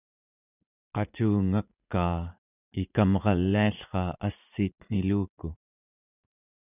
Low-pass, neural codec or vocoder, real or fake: 3.6 kHz; vocoder, 44.1 kHz, 80 mel bands, Vocos; fake